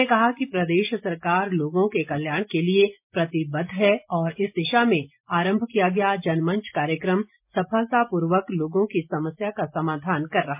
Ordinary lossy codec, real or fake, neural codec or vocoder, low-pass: MP3, 32 kbps; real; none; 3.6 kHz